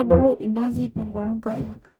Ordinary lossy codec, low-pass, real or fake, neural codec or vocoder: none; none; fake; codec, 44.1 kHz, 0.9 kbps, DAC